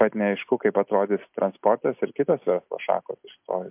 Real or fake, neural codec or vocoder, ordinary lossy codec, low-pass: real; none; MP3, 32 kbps; 3.6 kHz